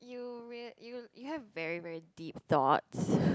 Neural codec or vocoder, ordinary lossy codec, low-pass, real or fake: none; none; none; real